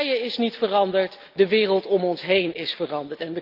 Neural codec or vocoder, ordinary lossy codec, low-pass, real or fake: none; Opus, 32 kbps; 5.4 kHz; real